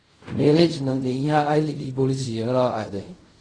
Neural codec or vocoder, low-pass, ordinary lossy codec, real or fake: codec, 16 kHz in and 24 kHz out, 0.4 kbps, LongCat-Audio-Codec, fine tuned four codebook decoder; 9.9 kHz; AAC, 32 kbps; fake